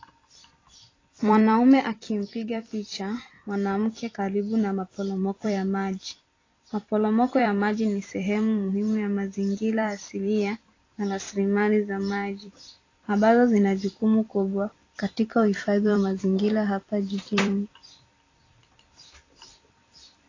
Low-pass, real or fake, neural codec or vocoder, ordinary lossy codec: 7.2 kHz; fake; vocoder, 44.1 kHz, 128 mel bands every 256 samples, BigVGAN v2; AAC, 32 kbps